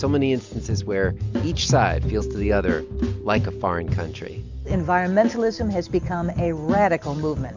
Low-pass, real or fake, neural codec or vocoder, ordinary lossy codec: 7.2 kHz; real; none; MP3, 64 kbps